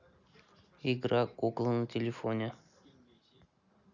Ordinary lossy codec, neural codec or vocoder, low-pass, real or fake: none; none; 7.2 kHz; real